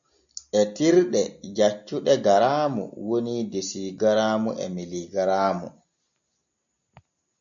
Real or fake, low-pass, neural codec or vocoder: real; 7.2 kHz; none